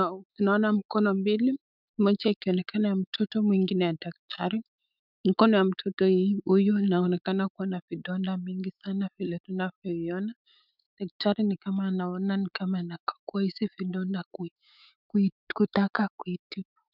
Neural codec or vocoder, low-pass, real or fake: autoencoder, 48 kHz, 128 numbers a frame, DAC-VAE, trained on Japanese speech; 5.4 kHz; fake